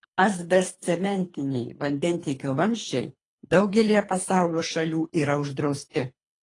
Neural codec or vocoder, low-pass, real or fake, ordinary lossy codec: codec, 24 kHz, 3 kbps, HILCodec; 10.8 kHz; fake; AAC, 32 kbps